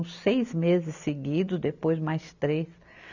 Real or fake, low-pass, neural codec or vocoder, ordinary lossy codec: real; 7.2 kHz; none; none